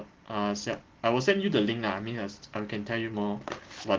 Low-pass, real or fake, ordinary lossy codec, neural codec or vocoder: 7.2 kHz; real; Opus, 16 kbps; none